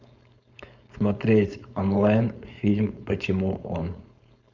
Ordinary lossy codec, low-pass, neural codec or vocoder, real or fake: Opus, 64 kbps; 7.2 kHz; codec, 16 kHz, 4.8 kbps, FACodec; fake